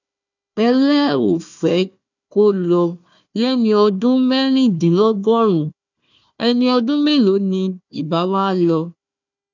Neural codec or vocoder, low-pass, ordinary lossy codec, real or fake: codec, 16 kHz, 1 kbps, FunCodec, trained on Chinese and English, 50 frames a second; 7.2 kHz; none; fake